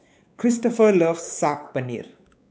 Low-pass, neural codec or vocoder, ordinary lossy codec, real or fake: none; codec, 16 kHz, 4 kbps, X-Codec, WavLM features, trained on Multilingual LibriSpeech; none; fake